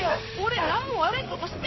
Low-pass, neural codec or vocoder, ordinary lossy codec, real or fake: 7.2 kHz; codec, 16 kHz in and 24 kHz out, 1 kbps, XY-Tokenizer; MP3, 24 kbps; fake